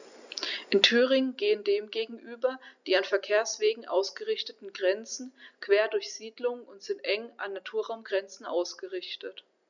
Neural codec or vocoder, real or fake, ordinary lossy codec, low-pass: none; real; none; 7.2 kHz